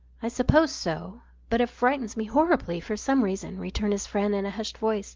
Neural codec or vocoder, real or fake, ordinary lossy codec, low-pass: none; real; Opus, 32 kbps; 7.2 kHz